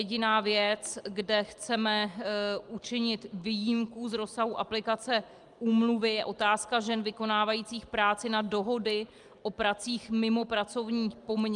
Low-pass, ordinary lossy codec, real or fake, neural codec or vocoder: 10.8 kHz; Opus, 32 kbps; real; none